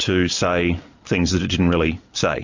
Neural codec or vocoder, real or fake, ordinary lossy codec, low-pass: none; real; MP3, 64 kbps; 7.2 kHz